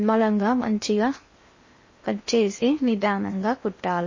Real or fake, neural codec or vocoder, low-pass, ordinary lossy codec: fake; codec, 16 kHz in and 24 kHz out, 0.8 kbps, FocalCodec, streaming, 65536 codes; 7.2 kHz; MP3, 32 kbps